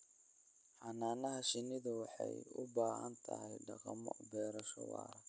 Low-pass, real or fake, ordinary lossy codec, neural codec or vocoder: none; real; none; none